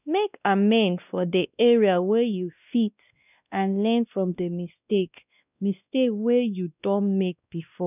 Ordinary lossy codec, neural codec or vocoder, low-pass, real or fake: none; codec, 16 kHz, 1 kbps, X-Codec, WavLM features, trained on Multilingual LibriSpeech; 3.6 kHz; fake